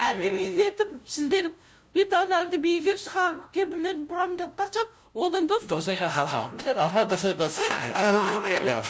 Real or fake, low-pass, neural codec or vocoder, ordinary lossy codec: fake; none; codec, 16 kHz, 0.5 kbps, FunCodec, trained on LibriTTS, 25 frames a second; none